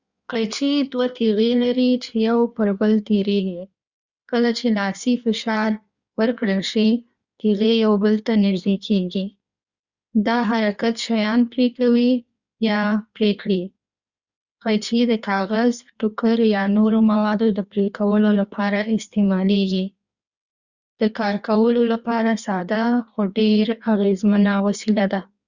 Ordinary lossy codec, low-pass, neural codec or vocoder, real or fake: Opus, 64 kbps; 7.2 kHz; codec, 16 kHz in and 24 kHz out, 1.1 kbps, FireRedTTS-2 codec; fake